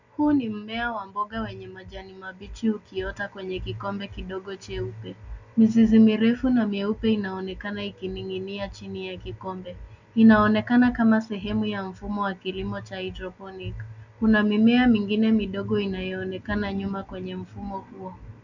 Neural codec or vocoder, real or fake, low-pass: none; real; 7.2 kHz